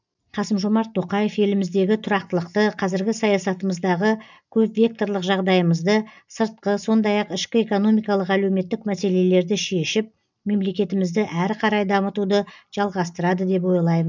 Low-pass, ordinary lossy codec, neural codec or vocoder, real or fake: 7.2 kHz; none; none; real